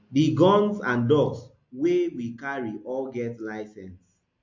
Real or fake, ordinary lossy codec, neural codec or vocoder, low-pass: real; MP3, 48 kbps; none; 7.2 kHz